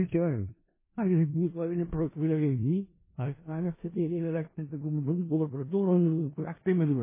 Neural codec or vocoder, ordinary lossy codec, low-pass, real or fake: codec, 16 kHz in and 24 kHz out, 0.4 kbps, LongCat-Audio-Codec, four codebook decoder; MP3, 16 kbps; 3.6 kHz; fake